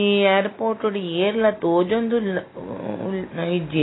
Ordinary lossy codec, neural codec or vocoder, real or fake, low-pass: AAC, 16 kbps; none; real; 7.2 kHz